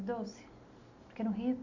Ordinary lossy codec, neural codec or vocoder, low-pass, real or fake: AAC, 48 kbps; none; 7.2 kHz; real